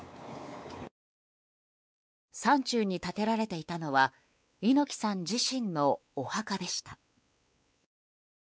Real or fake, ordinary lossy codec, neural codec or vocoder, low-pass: fake; none; codec, 16 kHz, 4 kbps, X-Codec, WavLM features, trained on Multilingual LibriSpeech; none